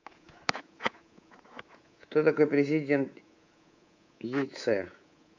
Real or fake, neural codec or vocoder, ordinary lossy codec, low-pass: fake; codec, 24 kHz, 3.1 kbps, DualCodec; AAC, 48 kbps; 7.2 kHz